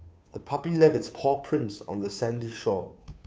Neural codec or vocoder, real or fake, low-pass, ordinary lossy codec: codec, 16 kHz, 2 kbps, FunCodec, trained on Chinese and English, 25 frames a second; fake; none; none